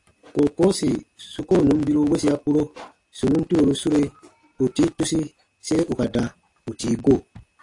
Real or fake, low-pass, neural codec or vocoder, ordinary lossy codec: real; 10.8 kHz; none; AAC, 48 kbps